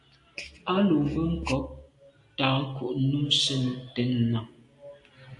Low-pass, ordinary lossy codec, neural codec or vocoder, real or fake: 10.8 kHz; AAC, 64 kbps; none; real